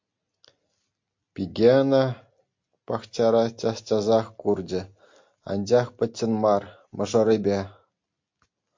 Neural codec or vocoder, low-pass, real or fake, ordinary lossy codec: none; 7.2 kHz; real; MP3, 48 kbps